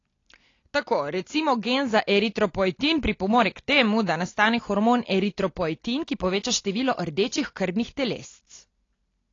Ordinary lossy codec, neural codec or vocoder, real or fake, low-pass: AAC, 32 kbps; none; real; 7.2 kHz